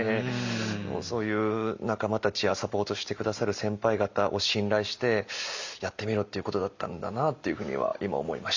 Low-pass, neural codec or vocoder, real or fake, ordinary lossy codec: 7.2 kHz; none; real; Opus, 64 kbps